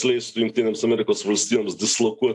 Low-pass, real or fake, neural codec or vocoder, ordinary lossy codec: 10.8 kHz; real; none; AAC, 64 kbps